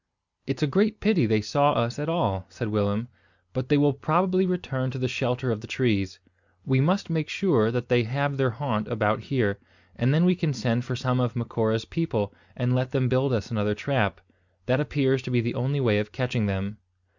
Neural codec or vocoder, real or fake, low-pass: none; real; 7.2 kHz